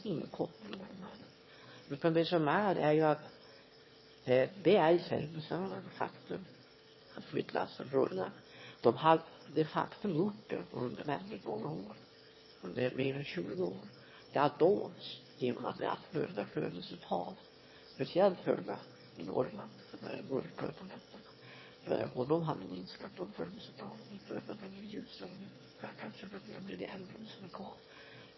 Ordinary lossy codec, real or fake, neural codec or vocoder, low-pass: MP3, 24 kbps; fake; autoencoder, 22.05 kHz, a latent of 192 numbers a frame, VITS, trained on one speaker; 7.2 kHz